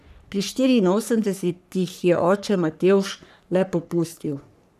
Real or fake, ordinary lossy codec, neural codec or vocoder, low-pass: fake; none; codec, 44.1 kHz, 3.4 kbps, Pupu-Codec; 14.4 kHz